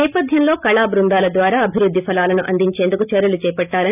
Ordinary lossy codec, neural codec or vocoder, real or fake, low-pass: none; none; real; 3.6 kHz